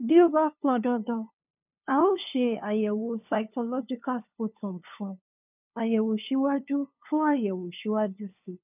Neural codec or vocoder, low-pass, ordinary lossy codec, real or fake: codec, 16 kHz, 2 kbps, FunCodec, trained on LibriTTS, 25 frames a second; 3.6 kHz; none; fake